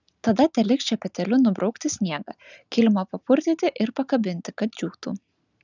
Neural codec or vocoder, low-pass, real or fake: none; 7.2 kHz; real